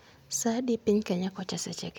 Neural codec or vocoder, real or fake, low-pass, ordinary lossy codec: none; real; none; none